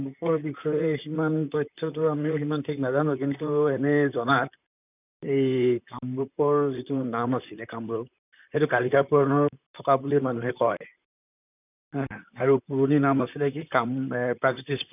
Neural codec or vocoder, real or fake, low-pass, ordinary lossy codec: vocoder, 44.1 kHz, 128 mel bands, Pupu-Vocoder; fake; 3.6 kHz; none